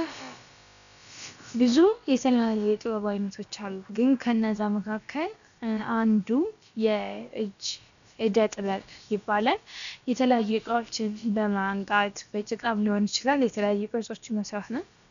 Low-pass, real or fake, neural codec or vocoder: 7.2 kHz; fake; codec, 16 kHz, about 1 kbps, DyCAST, with the encoder's durations